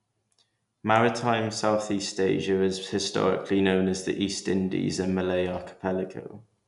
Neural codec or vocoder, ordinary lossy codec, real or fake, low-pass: none; none; real; 10.8 kHz